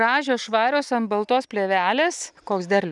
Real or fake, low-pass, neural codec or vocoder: real; 10.8 kHz; none